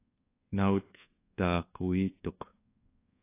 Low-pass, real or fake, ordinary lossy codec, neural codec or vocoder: 3.6 kHz; fake; AAC, 24 kbps; codec, 24 kHz, 1.2 kbps, DualCodec